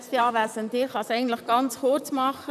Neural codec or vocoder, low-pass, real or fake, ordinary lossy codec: vocoder, 44.1 kHz, 128 mel bands, Pupu-Vocoder; 14.4 kHz; fake; none